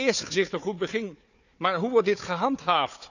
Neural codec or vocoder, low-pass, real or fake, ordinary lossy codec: codec, 16 kHz, 4 kbps, FunCodec, trained on Chinese and English, 50 frames a second; 7.2 kHz; fake; none